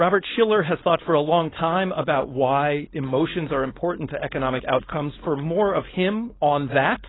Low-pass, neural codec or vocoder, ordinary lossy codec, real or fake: 7.2 kHz; codec, 16 kHz, 4.8 kbps, FACodec; AAC, 16 kbps; fake